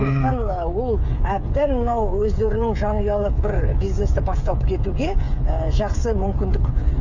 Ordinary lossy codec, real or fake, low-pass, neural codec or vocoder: none; fake; 7.2 kHz; codec, 16 kHz, 8 kbps, FreqCodec, smaller model